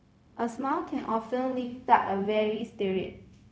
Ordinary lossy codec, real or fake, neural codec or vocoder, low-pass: none; fake; codec, 16 kHz, 0.4 kbps, LongCat-Audio-Codec; none